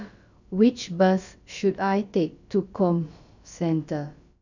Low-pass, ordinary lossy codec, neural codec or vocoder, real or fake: 7.2 kHz; none; codec, 16 kHz, about 1 kbps, DyCAST, with the encoder's durations; fake